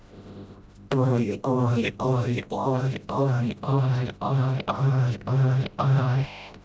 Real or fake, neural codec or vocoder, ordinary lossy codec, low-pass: fake; codec, 16 kHz, 0.5 kbps, FreqCodec, smaller model; none; none